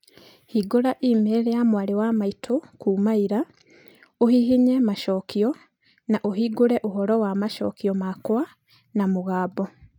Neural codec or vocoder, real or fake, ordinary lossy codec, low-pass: none; real; none; 19.8 kHz